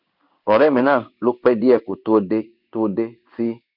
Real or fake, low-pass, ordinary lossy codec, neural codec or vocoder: fake; 5.4 kHz; none; codec, 16 kHz in and 24 kHz out, 1 kbps, XY-Tokenizer